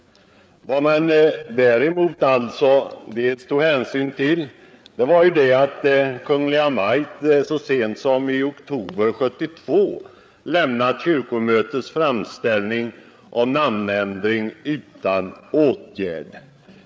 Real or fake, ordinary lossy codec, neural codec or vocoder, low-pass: fake; none; codec, 16 kHz, 8 kbps, FreqCodec, larger model; none